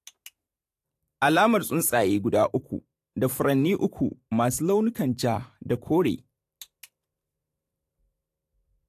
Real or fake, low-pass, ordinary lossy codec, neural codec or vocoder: fake; 14.4 kHz; AAC, 64 kbps; vocoder, 44.1 kHz, 128 mel bands every 512 samples, BigVGAN v2